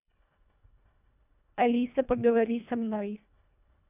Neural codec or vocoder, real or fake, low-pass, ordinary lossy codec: codec, 24 kHz, 1.5 kbps, HILCodec; fake; 3.6 kHz; none